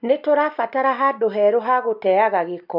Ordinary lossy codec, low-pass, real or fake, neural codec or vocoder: none; 5.4 kHz; real; none